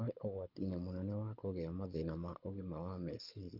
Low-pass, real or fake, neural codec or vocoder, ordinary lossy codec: 5.4 kHz; fake; codec, 24 kHz, 6 kbps, HILCodec; none